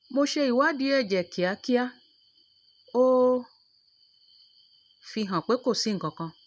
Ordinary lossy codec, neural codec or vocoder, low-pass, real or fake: none; none; none; real